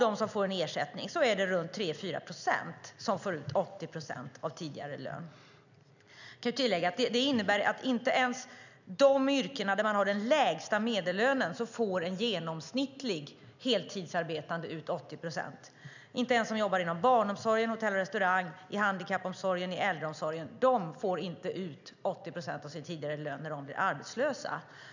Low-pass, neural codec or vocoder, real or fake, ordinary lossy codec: 7.2 kHz; none; real; none